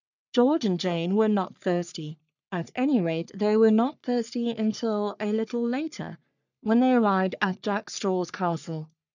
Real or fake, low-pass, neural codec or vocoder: fake; 7.2 kHz; codec, 44.1 kHz, 3.4 kbps, Pupu-Codec